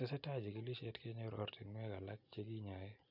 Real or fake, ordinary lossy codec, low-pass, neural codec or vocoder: real; none; 5.4 kHz; none